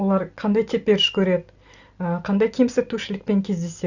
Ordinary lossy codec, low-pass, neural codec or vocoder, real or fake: Opus, 64 kbps; 7.2 kHz; none; real